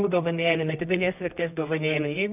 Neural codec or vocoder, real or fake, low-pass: codec, 24 kHz, 0.9 kbps, WavTokenizer, medium music audio release; fake; 3.6 kHz